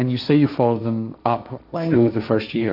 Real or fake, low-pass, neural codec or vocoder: fake; 5.4 kHz; codec, 16 kHz, 1.1 kbps, Voila-Tokenizer